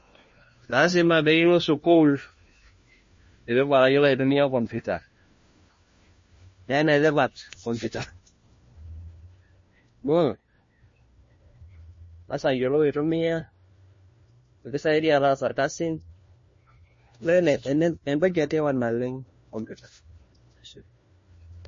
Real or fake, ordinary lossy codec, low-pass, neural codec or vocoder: fake; MP3, 32 kbps; 7.2 kHz; codec, 16 kHz, 1 kbps, FunCodec, trained on LibriTTS, 50 frames a second